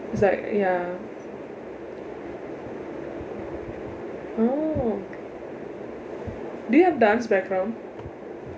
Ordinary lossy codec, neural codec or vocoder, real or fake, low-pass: none; none; real; none